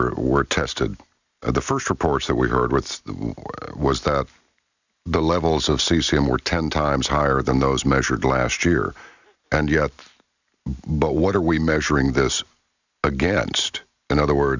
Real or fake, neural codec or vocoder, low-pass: real; none; 7.2 kHz